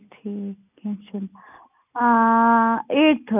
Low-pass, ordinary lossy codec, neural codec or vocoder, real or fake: 3.6 kHz; none; none; real